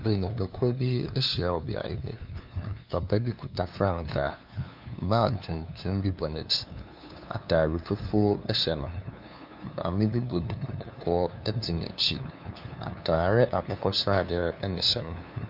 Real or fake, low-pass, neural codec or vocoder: fake; 5.4 kHz; codec, 16 kHz, 2 kbps, FunCodec, trained on LibriTTS, 25 frames a second